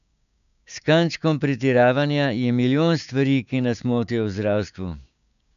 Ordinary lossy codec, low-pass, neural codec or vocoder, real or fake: none; 7.2 kHz; codec, 16 kHz, 6 kbps, DAC; fake